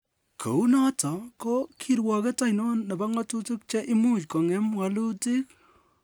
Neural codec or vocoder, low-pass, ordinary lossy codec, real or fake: none; none; none; real